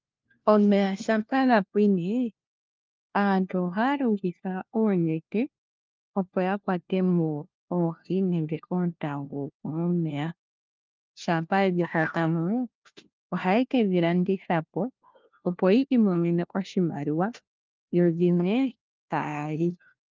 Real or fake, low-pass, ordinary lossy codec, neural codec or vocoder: fake; 7.2 kHz; Opus, 24 kbps; codec, 16 kHz, 1 kbps, FunCodec, trained on LibriTTS, 50 frames a second